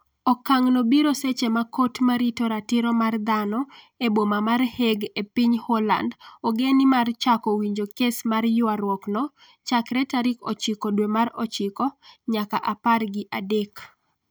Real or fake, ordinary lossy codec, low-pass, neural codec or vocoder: real; none; none; none